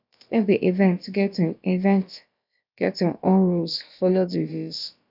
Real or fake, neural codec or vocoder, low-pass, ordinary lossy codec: fake; codec, 16 kHz, about 1 kbps, DyCAST, with the encoder's durations; 5.4 kHz; none